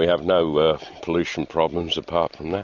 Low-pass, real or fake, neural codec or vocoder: 7.2 kHz; real; none